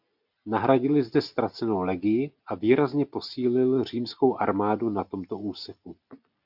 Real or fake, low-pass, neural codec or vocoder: real; 5.4 kHz; none